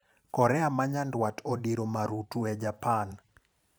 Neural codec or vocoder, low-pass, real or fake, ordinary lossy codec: vocoder, 44.1 kHz, 128 mel bands every 512 samples, BigVGAN v2; none; fake; none